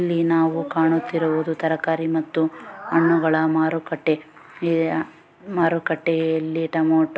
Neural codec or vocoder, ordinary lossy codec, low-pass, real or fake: none; none; none; real